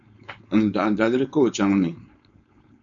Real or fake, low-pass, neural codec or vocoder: fake; 7.2 kHz; codec, 16 kHz, 4.8 kbps, FACodec